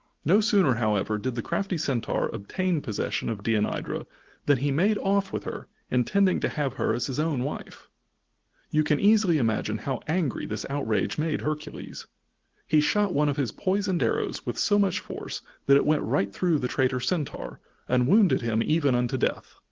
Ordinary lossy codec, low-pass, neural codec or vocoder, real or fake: Opus, 16 kbps; 7.2 kHz; none; real